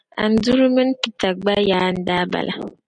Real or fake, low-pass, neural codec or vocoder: real; 9.9 kHz; none